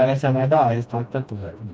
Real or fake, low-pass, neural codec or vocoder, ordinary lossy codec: fake; none; codec, 16 kHz, 1 kbps, FreqCodec, smaller model; none